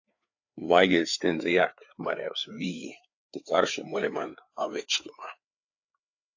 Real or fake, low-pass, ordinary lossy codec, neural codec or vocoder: fake; 7.2 kHz; AAC, 48 kbps; codec, 16 kHz, 4 kbps, FreqCodec, larger model